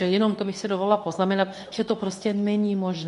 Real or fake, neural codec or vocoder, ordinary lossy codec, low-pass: fake; codec, 24 kHz, 0.9 kbps, WavTokenizer, medium speech release version 2; MP3, 96 kbps; 10.8 kHz